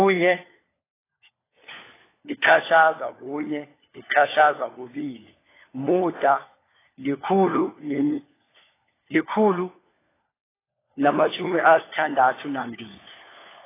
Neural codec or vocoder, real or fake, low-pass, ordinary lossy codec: codec, 16 kHz, 4 kbps, FunCodec, trained on LibriTTS, 50 frames a second; fake; 3.6 kHz; AAC, 16 kbps